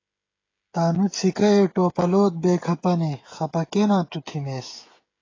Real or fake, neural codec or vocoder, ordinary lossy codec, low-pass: fake; codec, 16 kHz, 16 kbps, FreqCodec, smaller model; AAC, 32 kbps; 7.2 kHz